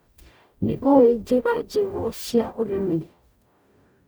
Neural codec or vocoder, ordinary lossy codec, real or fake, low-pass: codec, 44.1 kHz, 0.9 kbps, DAC; none; fake; none